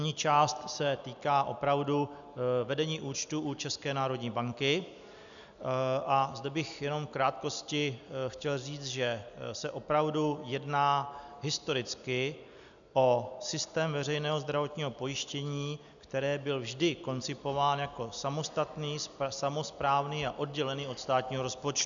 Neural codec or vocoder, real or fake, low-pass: none; real; 7.2 kHz